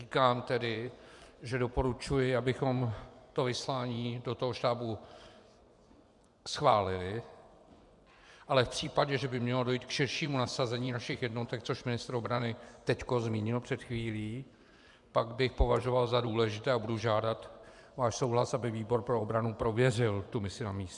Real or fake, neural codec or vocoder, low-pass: fake; vocoder, 24 kHz, 100 mel bands, Vocos; 10.8 kHz